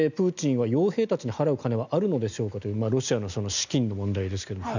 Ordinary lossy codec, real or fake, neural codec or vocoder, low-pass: none; real; none; 7.2 kHz